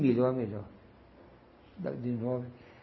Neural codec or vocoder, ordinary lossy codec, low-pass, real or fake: none; MP3, 24 kbps; 7.2 kHz; real